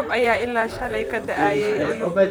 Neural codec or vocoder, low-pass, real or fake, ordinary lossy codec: vocoder, 44.1 kHz, 128 mel bands, Pupu-Vocoder; none; fake; none